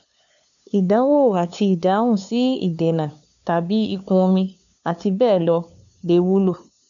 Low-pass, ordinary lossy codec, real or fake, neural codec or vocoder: 7.2 kHz; none; fake; codec, 16 kHz, 2 kbps, FunCodec, trained on LibriTTS, 25 frames a second